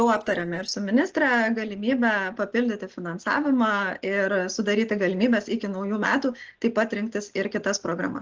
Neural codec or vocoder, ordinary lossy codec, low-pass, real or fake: none; Opus, 16 kbps; 7.2 kHz; real